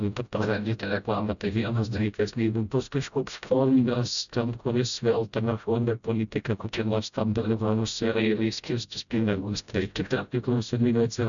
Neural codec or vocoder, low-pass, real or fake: codec, 16 kHz, 0.5 kbps, FreqCodec, smaller model; 7.2 kHz; fake